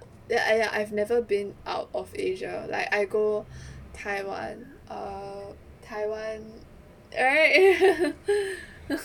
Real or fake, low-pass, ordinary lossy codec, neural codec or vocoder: real; 19.8 kHz; none; none